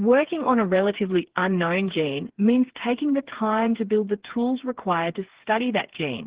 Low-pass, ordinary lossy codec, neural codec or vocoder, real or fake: 3.6 kHz; Opus, 16 kbps; codec, 16 kHz, 4 kbps, FreqCodec, smaller model; fake